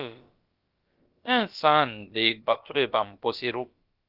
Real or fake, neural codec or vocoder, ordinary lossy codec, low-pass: fake; codec, 16 kHz, about 1 kbps, DyCAST, with the encoder's durations; Opus, 24 kbps; 5.4 kHz